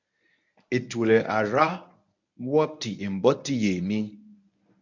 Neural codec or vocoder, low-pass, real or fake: codec, 24 kHz, 0.9 kbps, WavTokenizer, medium speech release version 1; 7.2 kHz; fake